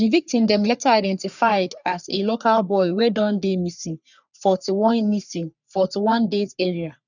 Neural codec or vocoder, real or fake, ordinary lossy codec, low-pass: codec, 44.1 kHz, 3.4 kbps, Pupu-Codec; fake; none; 7.2 kHz